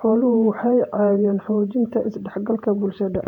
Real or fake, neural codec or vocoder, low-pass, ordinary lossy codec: fake; vocoder, 48 kHz, 128 mel bands, Vocos; 19.8 kHz; none